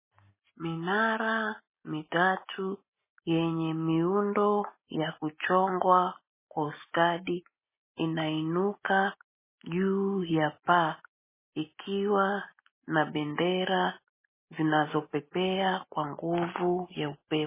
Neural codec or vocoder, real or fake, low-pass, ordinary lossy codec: none; real; 3.6 kHz; MP3, 16 kbps